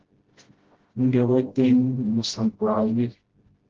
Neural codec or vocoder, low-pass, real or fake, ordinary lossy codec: codec, 16 kHz, 0.5 kbps, FreqCodec, smaller model; 7.2 kHz; fake; Opus, 16 kbps